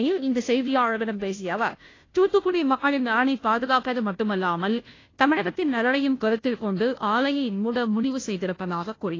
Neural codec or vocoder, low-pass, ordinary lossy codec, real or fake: codec, 16 kHz, 0.5 kbps, FunCodec, trained on Chinese and English, 25 frames a second; 7.2 kHz; AAC, 32 kbps; fake